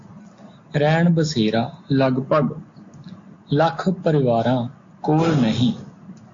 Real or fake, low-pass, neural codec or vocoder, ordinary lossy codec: real; 7.2 kHz; none; AAC, 48 kbps